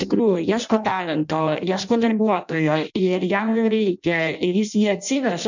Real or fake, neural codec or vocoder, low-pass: fake; codec, 16 kHz in and 24 kHz out, 0.6 kbps, FireRedTTS-2 codec; 7.2 kHz